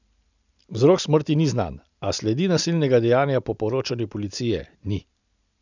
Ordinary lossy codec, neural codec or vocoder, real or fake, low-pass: none; none; real; 7.2 kHz